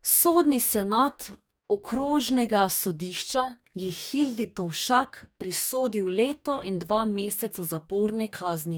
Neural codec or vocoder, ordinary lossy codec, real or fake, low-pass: codec, 44.1 kHz, 2.6 kbps, DAC; none; fake; none